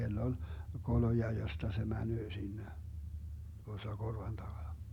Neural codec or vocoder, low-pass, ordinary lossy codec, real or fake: none; 19.8 kHz; none; real